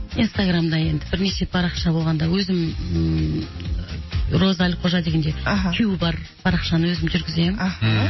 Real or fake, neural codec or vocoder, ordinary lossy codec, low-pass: real; none; MP3, 24 kbps; 7.2 kHz